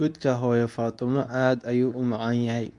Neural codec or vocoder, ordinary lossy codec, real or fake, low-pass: codec, 24 kHz, 0.9 kbps, WavTokenizer, medium speech release version 2; none; fake; 10.8 kHz